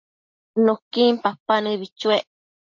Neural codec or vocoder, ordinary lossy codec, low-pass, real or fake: none; MP3, 48 kbps; 7.2 kHz; real